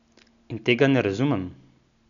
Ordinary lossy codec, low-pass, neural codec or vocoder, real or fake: none; 7.2 kHz; none; real